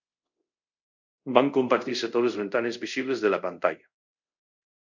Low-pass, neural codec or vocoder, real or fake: 7.2 kHz; codec, 24 kHz, 0.5 kbps, DualCodec; fake